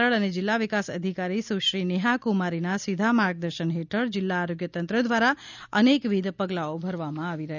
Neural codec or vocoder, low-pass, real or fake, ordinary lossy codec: none; 7.2 kHz; real; none